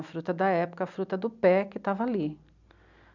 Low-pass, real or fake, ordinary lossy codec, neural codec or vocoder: 7.2 kHz; real; none; none